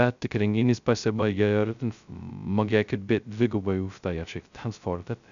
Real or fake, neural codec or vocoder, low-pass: fake; codec, 16 kHz, 0.2 kbps, FocalCodec; 7.2 kHz